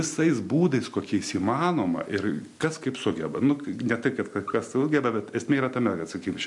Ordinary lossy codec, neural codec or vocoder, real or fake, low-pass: MP3, 64 kbps; none; real; 10.8 kHz